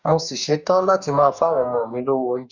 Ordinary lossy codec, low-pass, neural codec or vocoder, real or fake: none; 7.2 kHz; codec, 44.1 kHz, 2.6 kbps, DAC; fake